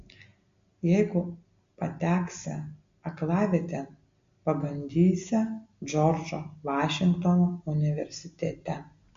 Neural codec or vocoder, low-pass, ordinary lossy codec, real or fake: none; 7.2 kHz; MP3, 48 kbps; real